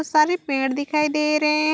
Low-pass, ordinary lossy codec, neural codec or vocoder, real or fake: none; none; none; real